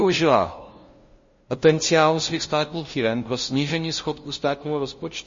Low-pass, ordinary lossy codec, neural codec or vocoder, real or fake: 7.2 kHz; MP3, 32 kbps; codec, 16 kHz, 0.5 kbps, FunCodec, trained on LibriTTS, 25 frames a second; fake